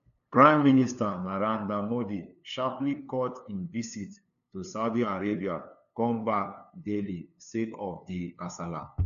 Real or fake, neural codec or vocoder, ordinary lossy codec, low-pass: fake; codec, 16 kHz, 2 kbps, FunCodec, trained on LibriTTS, 25 frames a second; none; 7.2 kHz